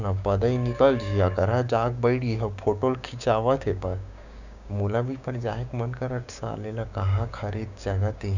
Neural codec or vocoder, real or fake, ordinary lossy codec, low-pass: codec, 16 kHz, 6 kbps, DAC; fake; none; 7.2 kHz